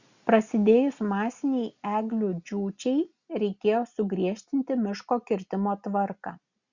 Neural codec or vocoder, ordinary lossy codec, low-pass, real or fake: none; Opus, 64 kbps; 7.2 kHz; real